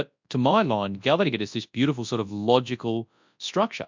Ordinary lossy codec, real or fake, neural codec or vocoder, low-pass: MP3, 64 kbps; fake; codec, 24 kHz, 0.9 kbps, WavTokenizer, large speech release; 7.2 kHz